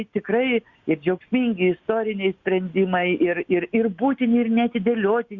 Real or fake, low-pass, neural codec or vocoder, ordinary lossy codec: real; 7.2 kHz; none; Opus, 64 kbps